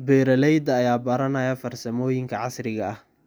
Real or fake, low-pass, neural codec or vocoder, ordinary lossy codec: real; none; none; none